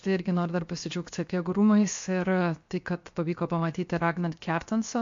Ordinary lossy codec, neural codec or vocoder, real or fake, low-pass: MP3, 48 kbps; codec, 16 kHz, 0.7 kbps, FocalCodec; fake; 7.2 kHz